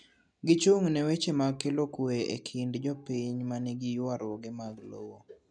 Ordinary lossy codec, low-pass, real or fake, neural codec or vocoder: none; 9.9 kHz; real; none